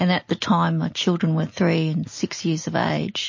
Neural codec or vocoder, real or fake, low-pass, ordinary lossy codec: none; real; 7.2 kHz; MP3, 32 kbps